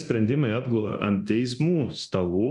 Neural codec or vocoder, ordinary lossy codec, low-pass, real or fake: codec, 24 kHz, 0.9 kbps, DualCodec; MP3, 96 kbps; 10.8 kHz; fake